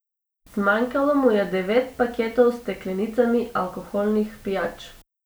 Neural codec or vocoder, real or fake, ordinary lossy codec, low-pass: none; real; none; none